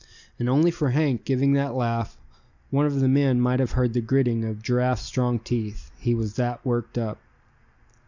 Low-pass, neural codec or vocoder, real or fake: 7.2 kHz; none; real